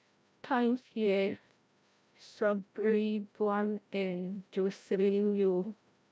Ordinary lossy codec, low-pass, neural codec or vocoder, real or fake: none; none; codec, 16 kHz, 0.5 kbps, FreqCodec, larger model; fake